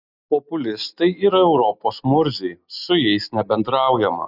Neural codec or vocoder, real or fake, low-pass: none; real; 5.4 kHz